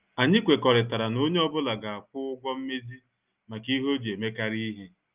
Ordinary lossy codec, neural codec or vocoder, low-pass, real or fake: Opus, 32 kbps; none; 3.6 kHz; real